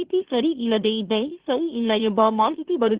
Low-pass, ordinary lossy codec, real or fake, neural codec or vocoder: 3.6 kHz; Opus, 16 kbps; fake; autoencoder, 44.1 kHz, a latent of 192 numbers a frame, MeloTTS